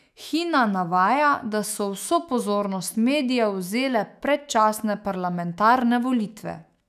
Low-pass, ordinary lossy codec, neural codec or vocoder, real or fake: 14.4 kHz; none; autoencoder, 48 kHz, 128 numbers a frame, DAC-VAE, trained on Japanese speech; fake